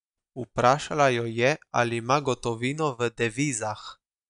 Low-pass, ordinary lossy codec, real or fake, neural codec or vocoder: 10.8 kHz; none; real; none